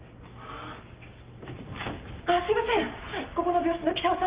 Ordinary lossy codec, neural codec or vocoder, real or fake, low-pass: Opus, 24 kbps; none; real; 3.6 kHz